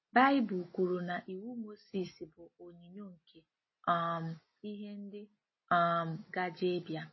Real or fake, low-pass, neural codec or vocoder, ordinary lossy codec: real; 7.2 kHz; none; MP3, 24 kbps